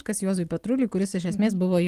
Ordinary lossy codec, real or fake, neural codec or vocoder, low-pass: Opus, 24 kbps; real; none; 14.4 kHz